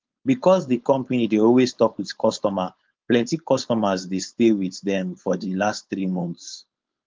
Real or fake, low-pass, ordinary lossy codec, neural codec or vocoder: fake; 7.2 kHz; Opus, 32 kbps; codec, 16 kHz, 4.8 kbps, FACodec